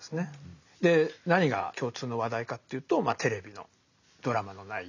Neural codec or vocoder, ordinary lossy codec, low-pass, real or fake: none; none; 7.2 kHz; real